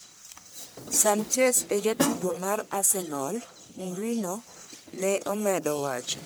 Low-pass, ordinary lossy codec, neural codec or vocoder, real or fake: none; none; codec, 44.1 kHz, 1.7 kbps, Pupu-Codec; fake